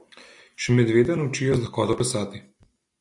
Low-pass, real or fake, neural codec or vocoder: 10.8 kHz; real; none